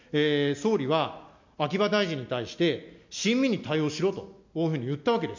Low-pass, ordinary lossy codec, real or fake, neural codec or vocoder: 7.2 kHz; MP3, 64 kbps; real; none